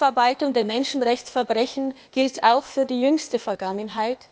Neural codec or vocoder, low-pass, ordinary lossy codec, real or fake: codec, 16 kHz, 0.8 kbps, ZipCodec; none; none; fake